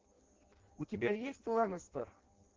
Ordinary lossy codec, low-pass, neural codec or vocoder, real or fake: Opus, 16 kbps; 7.2 kHz; codec, 16 kHz in and 24 kHz out, 0.6 kbps, FireRedTTS-2 codec; fake